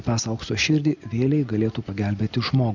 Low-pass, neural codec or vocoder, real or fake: 7.2 kHz; none; real